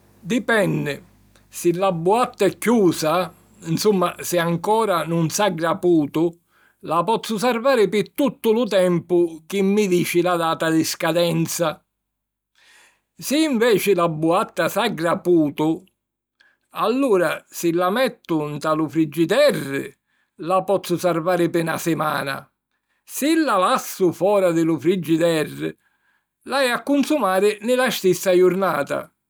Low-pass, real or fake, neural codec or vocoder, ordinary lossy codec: none; real; none; none